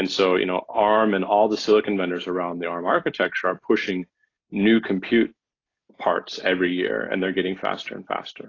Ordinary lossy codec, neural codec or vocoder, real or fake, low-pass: AAC, 32 kbps; none; real; 7.2 kHz